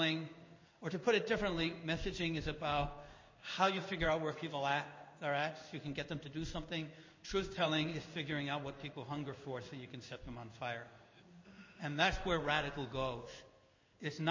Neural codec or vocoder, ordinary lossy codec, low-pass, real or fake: codec, 16 kHz in and 24 kHz out, 1 kbps, XY-Tokenizer; MP3, 32 kbps; 7.2 kHz; fake